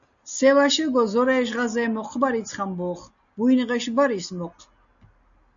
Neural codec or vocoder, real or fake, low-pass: none; real; 7.2 kHz